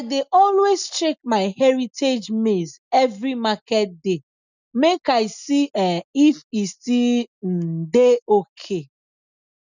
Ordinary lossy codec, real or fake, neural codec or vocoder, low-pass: none; real; none; 7.2 kHz